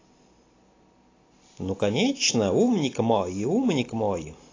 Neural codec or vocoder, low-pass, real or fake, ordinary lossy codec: none; 7.2 kHz; real; AAC, 32 kbps